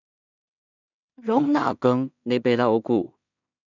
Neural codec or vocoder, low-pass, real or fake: codec, 16 kHz in and 24 kHz out, 0.4 kbps, LongCat-Audio-Codec, two codebook decoder; 7.2 kHz; fake